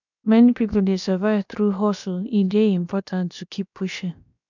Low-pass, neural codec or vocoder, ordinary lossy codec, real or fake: 7.2 kHz; codec, 16 kHz, about 1 kbps, DyCAST, with the encoder's durations; none; fake